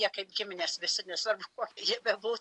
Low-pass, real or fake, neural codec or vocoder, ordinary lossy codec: 10.8 kHz; real; none; AAC, 64 kbps